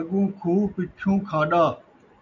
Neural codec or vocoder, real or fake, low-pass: none; real; 7.2 kHz